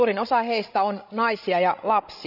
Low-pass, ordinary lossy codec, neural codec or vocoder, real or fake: 5.4 kHz; none; codec, 16 kHz, 16 kbps, FreqCodec, larger model; fake